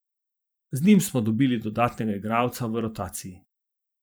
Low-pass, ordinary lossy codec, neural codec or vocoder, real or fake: none; none; vocoder, 44.1 kHz, 128 mel bands every 512 samples, BigVGAN v2; fake